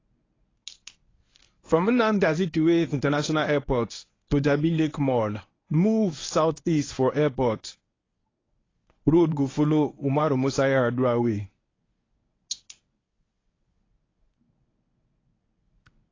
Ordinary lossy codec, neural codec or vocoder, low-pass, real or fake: AAC, 32 kbps; codec, 24 kHz, 0.9 kbps, WavTokenizer, medium speech release version 1; 7.2 kHz; fake